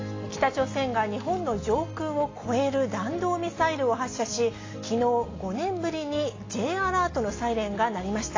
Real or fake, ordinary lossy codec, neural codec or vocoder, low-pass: real; AAC, 32 kbps; none; 7.2 kHz